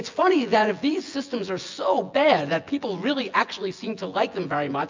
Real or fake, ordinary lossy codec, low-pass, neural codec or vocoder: fake; MP3, 64 kbps; 7.2 kHz; vocoder, 24 kHz, 100 mel bands, Vocos